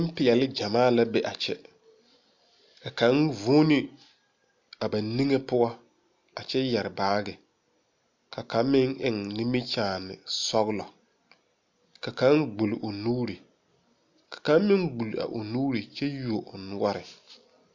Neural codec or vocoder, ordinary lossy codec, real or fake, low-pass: none; MP3, 64 kbps; real; 7.2 kHz